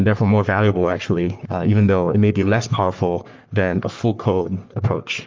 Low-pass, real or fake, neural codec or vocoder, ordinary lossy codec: 7.2 kHz; fake; codec, 44.1 kHz, 3.4 kbps, Pupu-Codec; Opus, 32 kbps